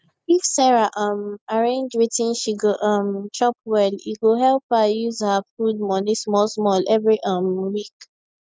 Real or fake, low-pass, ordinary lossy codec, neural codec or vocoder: real; none; none; none